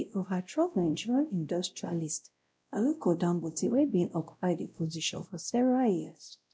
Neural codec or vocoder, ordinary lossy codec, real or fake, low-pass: codec, 16 kHz, 0.5 kbps, X-Codec, WavLM features, trained on Multilingual LibriSpeech; none; fake; none